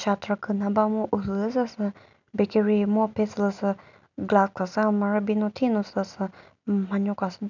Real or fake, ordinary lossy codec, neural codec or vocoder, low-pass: real; none; none; 7.2 kHz